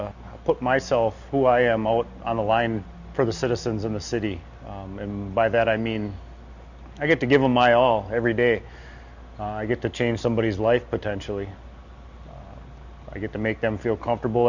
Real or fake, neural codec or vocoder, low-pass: real; none; 7.2 kHz